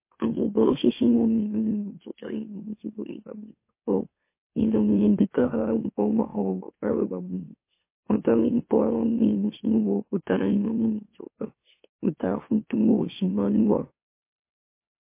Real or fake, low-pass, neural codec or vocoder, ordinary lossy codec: fake; 3.6 kHz; autoencoder, 44.1 kHz, a latent of 192 numbers a frame, MeloTTS; MP3, 24 kbps